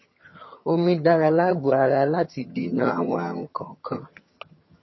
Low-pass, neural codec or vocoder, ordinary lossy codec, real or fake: 7.2 kHz; vocoder, 22.05 kHz, 80 mel bands, HiFi-GAN; MP3, 24 kbps; fake